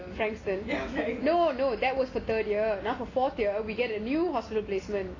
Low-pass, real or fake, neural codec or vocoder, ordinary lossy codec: 7.2 kHz; real; none; AAC, 32 kbps